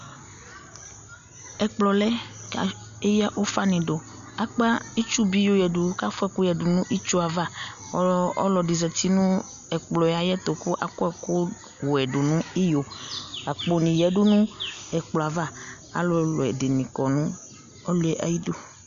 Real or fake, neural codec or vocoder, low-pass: real; none; 7.2 kHz